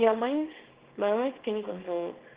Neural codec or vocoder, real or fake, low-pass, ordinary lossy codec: codec, 24 kHz, 0.9 kbps, WavTokenizer, small release; fake; 3.6 kHz; Opus, 16 kbps